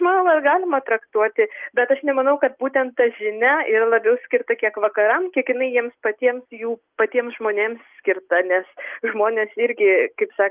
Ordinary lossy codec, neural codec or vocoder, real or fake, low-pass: Opus, 32 kbps; none; real; 3.6 kHz